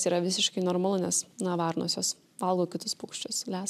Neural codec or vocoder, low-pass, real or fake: none; 14.4 kHz; real